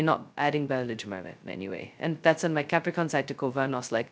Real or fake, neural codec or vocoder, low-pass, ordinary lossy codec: fake; codec, 16 kHz, 0.2 kbps, FocalCodec; none; none